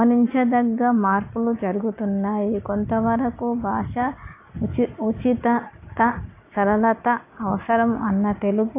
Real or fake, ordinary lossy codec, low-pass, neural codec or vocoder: real; AAC, 24 kbps; 3.6 kHz; none